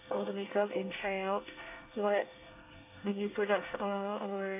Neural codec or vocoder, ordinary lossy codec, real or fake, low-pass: codec, 24 kHz, 1 kbps, SNAC; AAC, 24 kbps; fake; 3.6 kHz